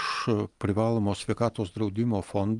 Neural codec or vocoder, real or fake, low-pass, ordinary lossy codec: none; real; 10.8 kHz; Opus, 32 kbps